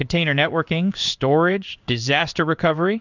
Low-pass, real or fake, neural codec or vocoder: 7.2 kHz; fake; codec, 16 kHz in and 24 kHz out, 1 kbps, XY-Tokenizer